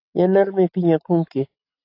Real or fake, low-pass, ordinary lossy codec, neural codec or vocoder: real; 5.4 kHz; AAC, 32 kbps; none